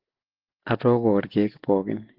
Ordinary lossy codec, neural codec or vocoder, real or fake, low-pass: Opus, 16 kbps; none; real; 5.4 kHz